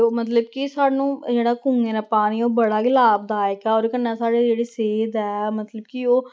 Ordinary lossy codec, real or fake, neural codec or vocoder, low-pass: none; real; none; none